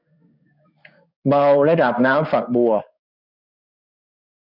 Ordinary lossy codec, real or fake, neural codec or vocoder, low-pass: none; fake; codec, 16 kHz in and 24 kHz out, 1 kbps, XY-Tokenizer; 5.4 kHz